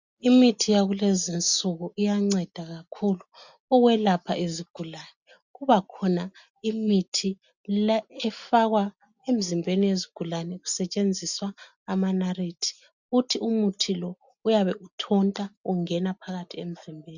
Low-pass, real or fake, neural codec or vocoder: 7.2 kHz; real; none